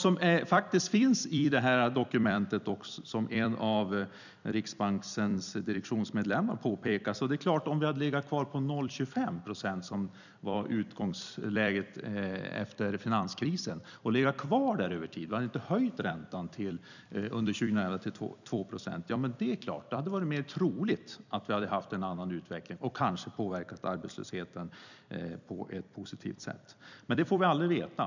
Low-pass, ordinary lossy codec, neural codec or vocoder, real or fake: 7.2 kHz; none; vocoder, 44.1 kHz, 128 mel bands every 256 samples, BigVGAN v2; fake